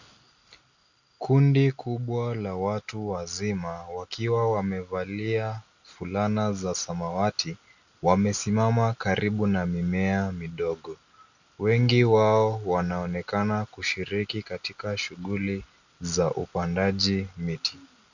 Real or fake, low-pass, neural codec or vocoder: real; 7.2 kHz; none